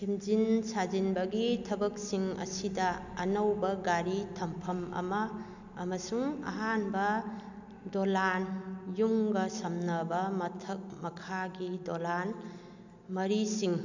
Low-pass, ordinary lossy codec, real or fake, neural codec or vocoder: 7.2 kHz; none; real; none